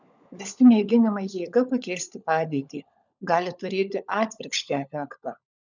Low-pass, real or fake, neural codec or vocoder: 7.2 kHz; fake; codec, 16 kHz, 16 kbps, FunCodec, trained on LibriTTS, 50 frames a second